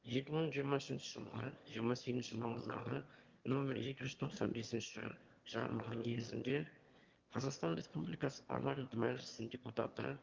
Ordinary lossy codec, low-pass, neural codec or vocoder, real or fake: Opus, 32 kbps; 7.2 kHz; autoencoder, 22.05 kHz, a latent of 192 numbers a frame, VITS, trained on one speaker; fake